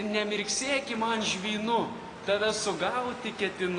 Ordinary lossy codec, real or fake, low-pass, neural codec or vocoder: AAC, 32 kbps; real; 9.9 kHz; none